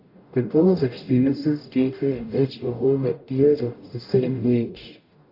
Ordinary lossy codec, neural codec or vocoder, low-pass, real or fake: AAC, 32 kbps; codec, 44.1 kHz, 0.9 kbps, DAC; 5.4 kHz; fake